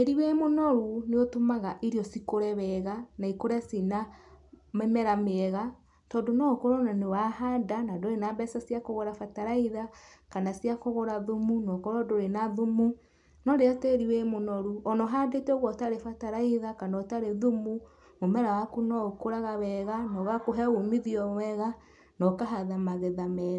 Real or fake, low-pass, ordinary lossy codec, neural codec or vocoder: real; 10.8 kHz; MP3, 96 kbps; none